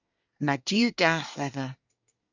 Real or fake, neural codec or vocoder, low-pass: fake; codec, 24 kHz, 1 kbps, SNAC; 7.2 kHz